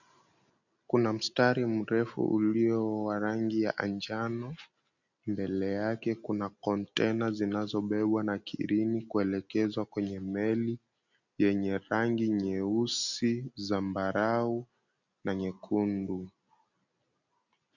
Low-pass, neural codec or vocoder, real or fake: 7.2 kHz; none; real